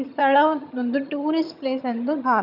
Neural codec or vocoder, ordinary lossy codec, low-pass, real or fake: vocoder, 22.05 kHz, 80 mel bands, HiFi-GAN; none; 5.4 kHz; fake